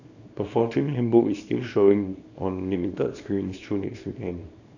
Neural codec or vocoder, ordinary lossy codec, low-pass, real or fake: codec, 24 kHz, 0.9 kbps, WavTokenizer, small release; none; 7.2 kHz; fake